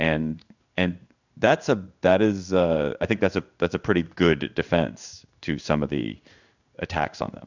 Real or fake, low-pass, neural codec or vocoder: fake; 7.2 kHz; codec, 16 kHz in and 24 kHz out, 1 kbps, XY-Tokenizer